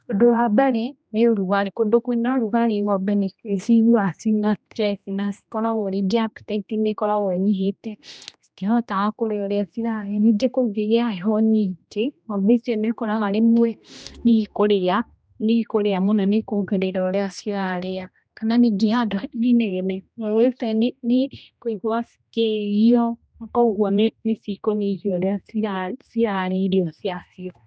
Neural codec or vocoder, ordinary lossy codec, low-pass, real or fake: codec, 16 kHz, 1 kbps, X-Codec, HuBERT features, trained on general audio; none; none; fake